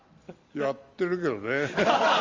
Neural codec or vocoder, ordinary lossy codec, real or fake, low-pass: none; Opus, 32 kbps; real; 7.2 kHz